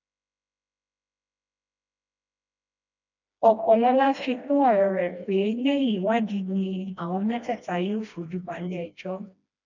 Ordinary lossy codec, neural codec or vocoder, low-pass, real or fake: none; codec, 16 kHz, 1 kbps, FreqCodec, smaller model; 7.2 kHz; fake